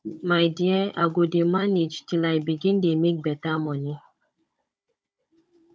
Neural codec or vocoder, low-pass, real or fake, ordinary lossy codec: codec, 16 kHz, 16 kbps, FunCodec, trained on Chinese and English, 50 frames a second; none; fake; none